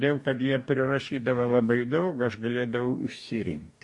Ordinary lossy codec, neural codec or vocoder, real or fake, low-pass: MP3, 48 kbps; codec, 44.1 kHz, 2.6 kbps, DAC; fake; 10.8 kHz